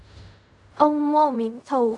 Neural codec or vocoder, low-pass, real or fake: codec, 16 kHz in and 24 kHz out, 0.4 kbps, LongCat-Audio-Codec, fine tuned four codebook decoder; 10.8 kHz; fake